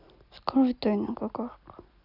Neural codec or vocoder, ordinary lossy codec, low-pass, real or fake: none; none; 5.4 kHz; real